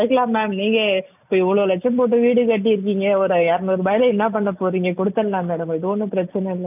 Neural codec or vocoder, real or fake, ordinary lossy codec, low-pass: none; real; none; 3.6 kHz